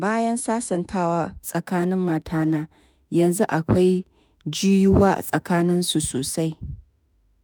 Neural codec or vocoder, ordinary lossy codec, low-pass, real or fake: autoencoder, 48 kHz, 32 numbers a frame, DAC-VAE, trained on Japanese speech; none; none; fake